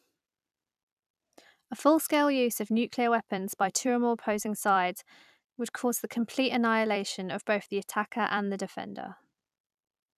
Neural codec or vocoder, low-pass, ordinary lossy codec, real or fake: vocoder, 44.1 kHz, 128 mel bands every 256 samples, BigVGAN v2; 14.4 kHz; none; fake